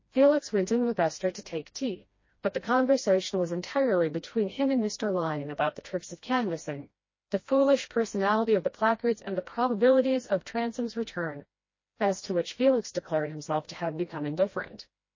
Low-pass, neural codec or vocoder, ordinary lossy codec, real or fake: 7.2 kHz; codec, 16 kHz, 1 kbps, FreqCodec, smaller model; MP3, 32 kbps; fake